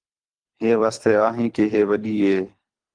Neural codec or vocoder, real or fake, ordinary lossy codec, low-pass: codec, 24 kHz, 3 kbps, HILCodec; fake; Opus, 32 kbps; 9.9 kHz